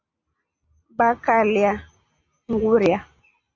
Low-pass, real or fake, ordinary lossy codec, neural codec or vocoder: 7.2 kHz; real; Opus, 64 kbps; none